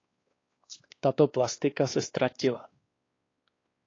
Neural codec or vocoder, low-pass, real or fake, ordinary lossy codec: codec, 16 kHz, 2 kbps, X-Codec, WavLM features, trained on Multilingual LibriSpeech; 7.2 kHz; fake; AAC, 32 kbps